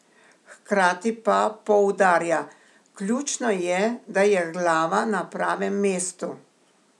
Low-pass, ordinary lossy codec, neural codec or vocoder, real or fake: none; none; none; real